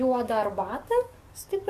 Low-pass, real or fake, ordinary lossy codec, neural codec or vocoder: 14.4 kHz; fake; MP3, 96 kbps; codec, 44.1 kHz, 7.8 kbps, DAC